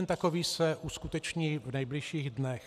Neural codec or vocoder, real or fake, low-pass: none; real; 14.4 kHz